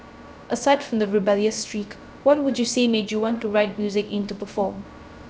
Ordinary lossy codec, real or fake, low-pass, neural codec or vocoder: none; fake; none; codec, 16 kHz, 0.3 kbps, FocalCodec